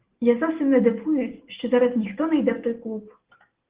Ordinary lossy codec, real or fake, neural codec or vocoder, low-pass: Opus, 16 kbps; real; none; 3.6 kHz